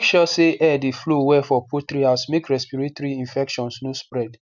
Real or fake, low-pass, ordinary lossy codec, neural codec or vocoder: real; 7.2 kHz; none; none